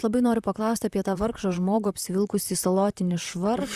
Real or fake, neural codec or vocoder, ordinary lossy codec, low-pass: fake; vocoder, 44.1 kHz, 128 mel bands, Pupu-Vocoder; Opus, 64 kbps; 14.4 kHz